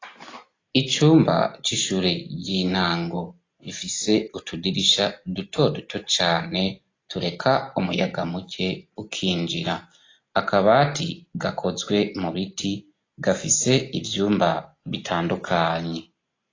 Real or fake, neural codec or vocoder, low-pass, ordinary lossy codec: real; none; 7.2 kHz; AAC, 32 kbps